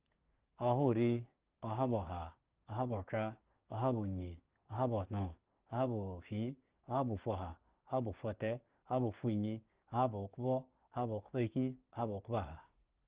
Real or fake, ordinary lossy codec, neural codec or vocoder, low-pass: fake; Opus, 16 kbps; codec, 16 kHz, 6 kbps, DAC; 3.6 kHz